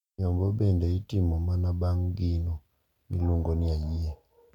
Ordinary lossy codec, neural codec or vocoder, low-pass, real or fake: none; none; 19.8 kHz; real